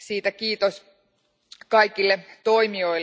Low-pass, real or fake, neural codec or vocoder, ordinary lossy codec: none; real; none; none